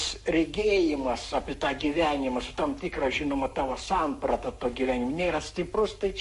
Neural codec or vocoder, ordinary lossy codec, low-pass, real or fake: codec, 44.1 kHz, 7.8 kbps, Pupu-Codec; MP3, 48 kbps; 14.4 kHz; fake